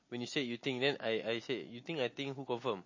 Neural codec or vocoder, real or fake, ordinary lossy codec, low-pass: none; real; MP3, 32 kbps; 7.2 kHz